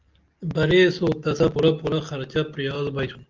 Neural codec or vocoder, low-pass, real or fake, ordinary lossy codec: none; 7.2 kHz; real; Opus, 24 kbps